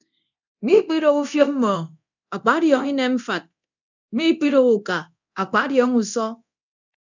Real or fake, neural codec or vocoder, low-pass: fake; codec, 24 kHz, 0.9 kbps, DualCodec; 7.2 kHz